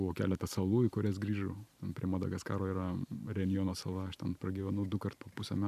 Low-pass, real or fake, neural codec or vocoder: 14.4 kHz; fake; vocoder, 44.1 kHz, 128 mel bands every 512 samples, BigVGAN v2